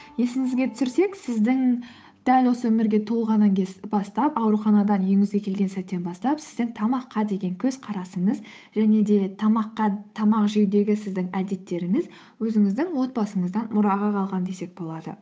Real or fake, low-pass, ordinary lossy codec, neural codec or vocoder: fake; none; none; codec, 16 kHz, 8 kbps, FunCodec, trained on Chinese and English, 25 frames a second